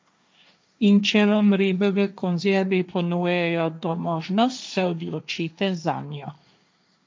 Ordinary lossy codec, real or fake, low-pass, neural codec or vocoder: none; fake; none; codec, 16 kHz, 1.1 kbps, Voila-Tokenizer